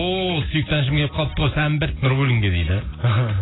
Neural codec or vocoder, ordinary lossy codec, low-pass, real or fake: none; AAC, 16 kbps; 7.2 kHz; real